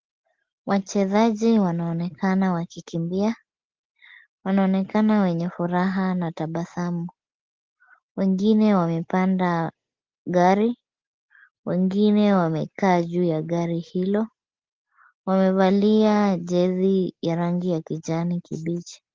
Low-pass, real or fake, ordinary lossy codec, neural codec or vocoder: 7.2 kHz; real; Opus, 16 kbps; none